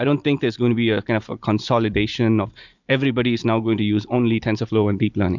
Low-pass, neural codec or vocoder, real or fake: 7.2 kHz; vocoder, 44.1 kHz, 128 mel bands every 256 samples, BigVGAN v2; fake